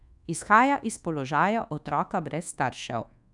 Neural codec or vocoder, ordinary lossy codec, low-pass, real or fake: codec, 24 kHz, 1.2 kbps, DualCodec; none; 10.8 kHz; fake